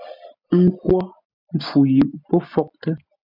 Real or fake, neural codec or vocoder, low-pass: real; none; 5.4 kHz